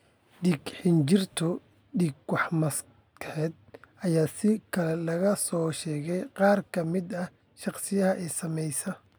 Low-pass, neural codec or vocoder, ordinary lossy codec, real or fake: none; none; none; real